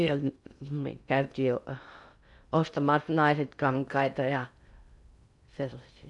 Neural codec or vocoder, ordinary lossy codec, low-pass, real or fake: codec, 16 kHz in and 24 kHz out, 0.6 kbps, FocalCodec, streaming, 2048 codes; none; 10.8 kHz; fake